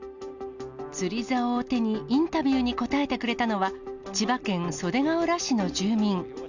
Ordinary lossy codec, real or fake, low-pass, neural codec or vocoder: none; real; 7.2 kHz; none